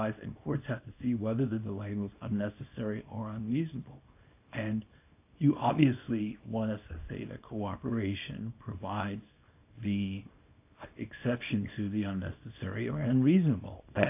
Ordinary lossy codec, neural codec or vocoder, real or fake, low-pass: AAC, 32 kbps; codec, 24 kHz, 0.9 kbps, WavTokenizer, small release; fake; 3.6 kHz